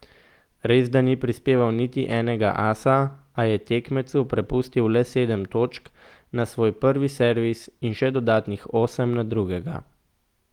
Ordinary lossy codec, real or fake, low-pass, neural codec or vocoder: Opus, 24 kbps; real; 19.8 kHz; none